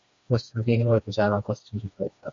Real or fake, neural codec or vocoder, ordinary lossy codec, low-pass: fake; codec, 16 kHz, 2 kbps, FreqCodec, smaller model; MP3, 48 kbps; 7.2 kHz